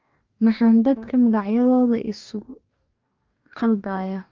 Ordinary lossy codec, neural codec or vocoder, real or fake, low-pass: Opus, 24 kbps; codec, 44.1 kHz, 2.6 kbps, DAC; fake; 7.2 kHz